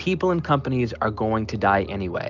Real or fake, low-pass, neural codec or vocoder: real; 7.2 kHz; none